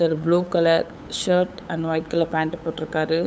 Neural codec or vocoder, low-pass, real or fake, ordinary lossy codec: codec, 16 kHz, 8 kbps, FunCodec, trained on LibriTTS, 25 frames a second; none; fake; none